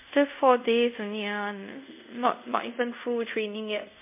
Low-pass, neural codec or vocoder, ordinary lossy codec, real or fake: 3.6 kHz; codec, 24 kHz, 0.5 kbps, DualCodec; MP3, 32 kbps; fake